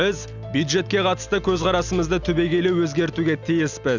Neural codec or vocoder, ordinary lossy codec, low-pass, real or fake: none; none; 7.2 kHz; real